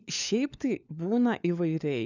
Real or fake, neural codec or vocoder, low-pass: fake; codec, 16 kHz, 4 kbps, FunCodec, trained on LibriTTS, 50 frames a second; 7.2 kHz